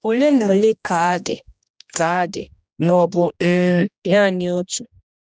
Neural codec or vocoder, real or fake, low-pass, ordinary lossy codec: codec, 16 kHz, 1 kbps, X-Codec, HuBERT features, trained on general audio; fake; none; none